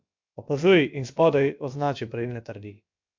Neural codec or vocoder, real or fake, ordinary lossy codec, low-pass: codec, 16 kHz, about 1 kbps, DyCAST, with the encoder's durations; fake; MP3, 64 kbps; 7.2 kHz